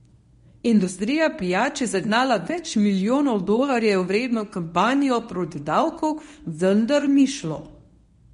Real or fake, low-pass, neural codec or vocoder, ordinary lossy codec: fake; 10.8 kHz; codec, 24 kHz, 0.9 kbps, WavTokenizer, medium speech release version 1; MP3, 48 kbps